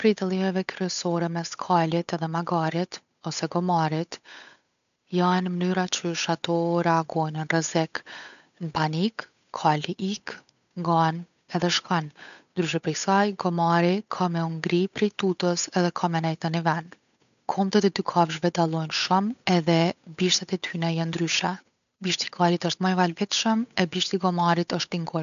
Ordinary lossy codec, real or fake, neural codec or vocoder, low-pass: none; fake; codec, 16 kHz, 8 kbps, FunCodec, trained on Chinese and English, 25 frames a second; 7.2 kHz